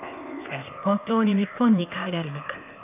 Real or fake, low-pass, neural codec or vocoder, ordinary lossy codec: fake; 3.6 kHz; codec, 16 kHz, 0.8 kbps, ZipCodec; none